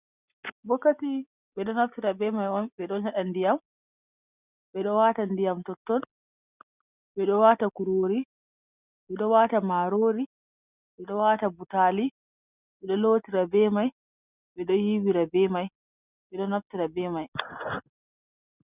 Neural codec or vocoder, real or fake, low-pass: none; real; 3.6 kHz